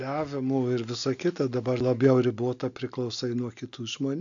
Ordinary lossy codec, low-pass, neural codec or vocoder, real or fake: AAC, 64 kbps; 7.2 kHz; none; real